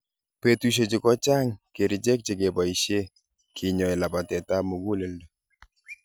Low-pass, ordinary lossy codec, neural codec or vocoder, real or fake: none; none; none; real